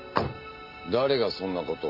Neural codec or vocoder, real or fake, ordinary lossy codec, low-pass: none; real; none; 5.4 kHz